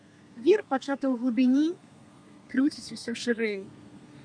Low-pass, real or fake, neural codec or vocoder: 9.9 kHz; fake; codec, 32 kHz, 1.9 kbps, SNAC